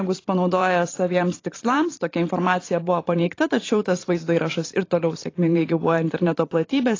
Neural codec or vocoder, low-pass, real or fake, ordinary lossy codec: vocoder, 44.1 kHz, 128 mel bands every 512 samples, BigVGAN v2; 7.2 kHz; fake; AAC, 32 kbps